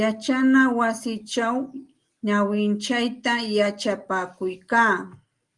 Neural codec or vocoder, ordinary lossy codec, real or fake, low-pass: none; Opus, 24 kbps; real; 10.8 kHz